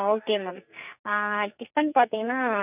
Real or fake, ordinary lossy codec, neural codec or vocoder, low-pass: fake; none; codec, 44.1 kHz, 2.6 kbps, SNAC; 3.6 kHz